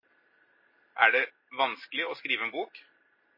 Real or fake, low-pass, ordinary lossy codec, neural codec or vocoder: real; 5.4 kHz; MP3, 24 kbps; none